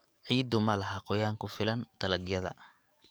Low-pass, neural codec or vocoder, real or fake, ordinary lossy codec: none; codec, 44.1 kHz, 7.8 kbps, DAC; fake; none